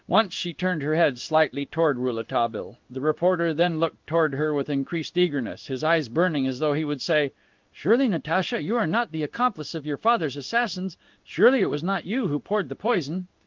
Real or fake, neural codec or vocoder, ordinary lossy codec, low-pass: fake; codec, 16 kHz in and 24 kHz out, 1 kbps, XY-Tokenizer; Opus, 24 kbps; 7.2 kHz